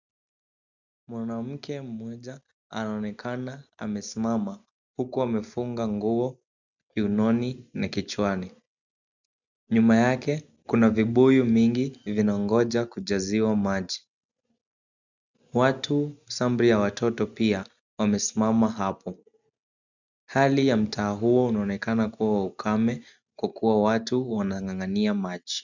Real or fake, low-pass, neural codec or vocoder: real; 7.2 kHz; none